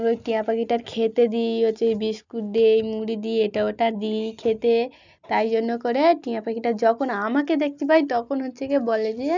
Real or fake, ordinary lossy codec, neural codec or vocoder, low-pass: real; none; none; 7.2 kHz